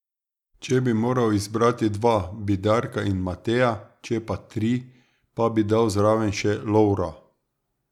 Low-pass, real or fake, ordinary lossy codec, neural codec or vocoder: 19.8 kHz; real; none; none